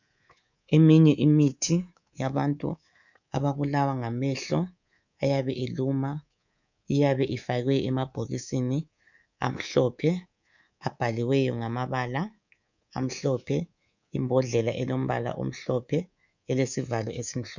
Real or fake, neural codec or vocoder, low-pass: fake; codec, 24 kHz, 3.1 kbps, DualCodec; 7.2 kHz